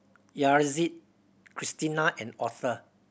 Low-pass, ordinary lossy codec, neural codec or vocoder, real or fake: none; none; none; real